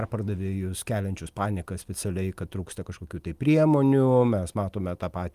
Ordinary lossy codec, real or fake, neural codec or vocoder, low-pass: Opus, 32 kbps; real; none; 14.4 kHz